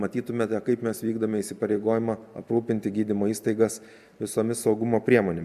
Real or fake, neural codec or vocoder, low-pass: real; none; 14.4 kHz